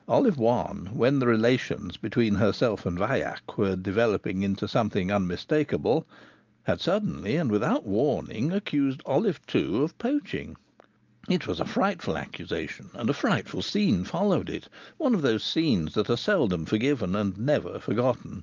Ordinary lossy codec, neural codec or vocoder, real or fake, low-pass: Opus, 24 kbps; none; real; 7.2 kHz